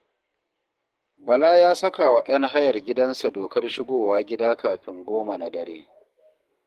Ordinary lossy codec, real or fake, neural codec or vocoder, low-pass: Opus, 24 kbps; fake; codec, 44.1 kHz, 2.6 kbps, SNAC; 14.4 kHz